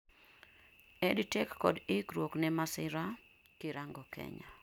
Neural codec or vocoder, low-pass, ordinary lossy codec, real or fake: none; 19.8 kHz; none; real